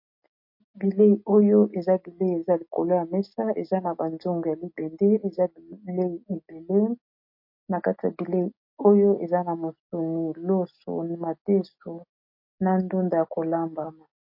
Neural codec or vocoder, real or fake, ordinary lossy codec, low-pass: none; real; MP3, 32 kbps; 5.4 kHz